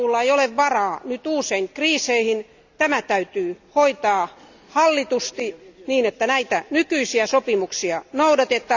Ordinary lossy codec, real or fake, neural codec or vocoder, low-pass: none; real; none; 7.2 kHz